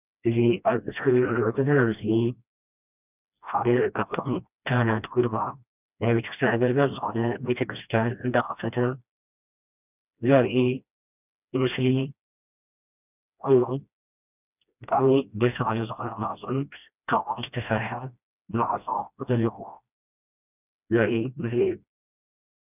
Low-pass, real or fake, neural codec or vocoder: 3.6 kHz; fake; codec, 16 kHz, 1 kbps, FreqCodec, smaller model